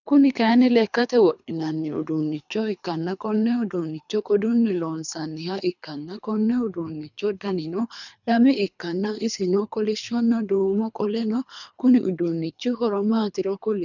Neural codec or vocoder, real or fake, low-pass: codec, 24 kHz, 3 kbps, HILCodec; fake; 7.2 kHz